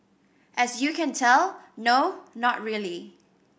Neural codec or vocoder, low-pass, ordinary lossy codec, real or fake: none; none; none; real